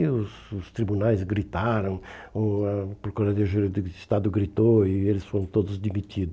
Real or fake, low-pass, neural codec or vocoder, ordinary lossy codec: real; none; none; none